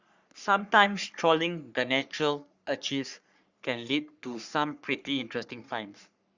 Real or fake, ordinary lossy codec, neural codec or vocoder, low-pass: fake; Opus, 64 kbps; codec, 44.1 kHz, 3.4 kbps, Pupu-Codec; 7.2 kHz